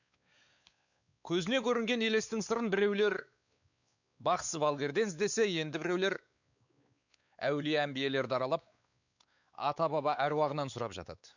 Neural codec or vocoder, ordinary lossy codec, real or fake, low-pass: codec, 16 kHz, 4 kbps, X-Codec, WavLM features, trained on Multilingual LibriSpeech; none; fake; 7.2 kHz